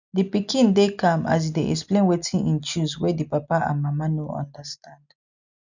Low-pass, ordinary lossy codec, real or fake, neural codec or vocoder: 7.2 kHz; none; real; none